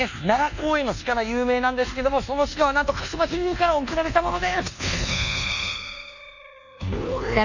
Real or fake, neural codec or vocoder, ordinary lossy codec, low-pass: fake; codec, 24 kHz, 1.2 kbps, DualCodec; none; 7.2 kHz